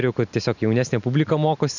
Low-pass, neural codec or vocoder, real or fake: 7.2 kHz; none; real